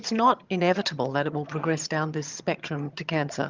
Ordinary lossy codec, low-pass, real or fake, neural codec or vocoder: Opus, 24 kbps; 7.2 kHz; fake; vocoder, 22.05 kHz, 80 mel bands, HiFi-GAN